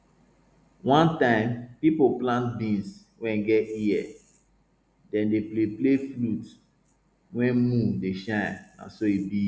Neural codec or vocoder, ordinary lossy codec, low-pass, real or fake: none; none; none; real